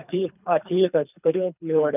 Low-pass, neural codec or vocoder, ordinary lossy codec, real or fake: 3.6 kHz; codec, 24 kHz, 3 kbps, HILCodec; none; fake